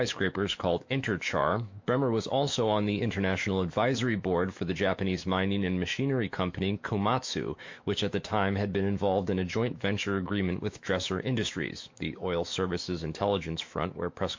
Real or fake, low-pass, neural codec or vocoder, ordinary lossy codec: fake; 7.2 kHz; autoencoder, 48 kHz, 128 numbers a frame, DAC-VAE, trained on Japanese speech; MP3, 48 kbps